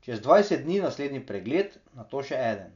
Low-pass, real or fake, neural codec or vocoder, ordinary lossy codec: 7.2 kHz; real; none; none